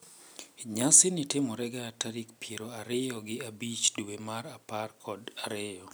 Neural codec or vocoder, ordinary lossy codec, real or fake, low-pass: none; none; real; none